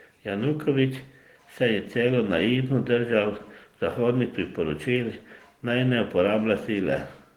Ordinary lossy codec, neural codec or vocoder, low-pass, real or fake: Opus, 16 kbps; none; 19.8 kHz; real